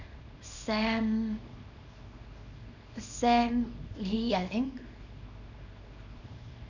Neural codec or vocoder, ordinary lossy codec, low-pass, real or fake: codec, 24 kHz, 0.9 kbps, WavTokenizer, small release; none; 7.2 kHz; fake